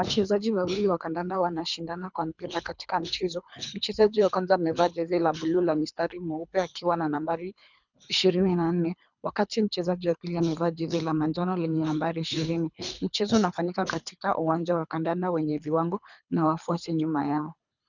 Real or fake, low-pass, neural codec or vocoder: fake; 7.2 kHz; codec, 24 kHz, 3 kbps, HILCodec